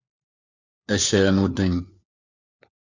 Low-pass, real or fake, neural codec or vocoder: 7.2 kHz; fake; codec, 16 kHz, 4 kbps, FunCodec, trained on LibriTTS, 50 frames a second